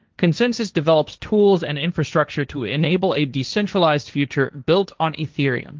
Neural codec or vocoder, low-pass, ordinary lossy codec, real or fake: codec, 16 kHz, 1.1 kbps, Voila-Tokenizer; 7.2 kHz; Opus, 24 kbps; fake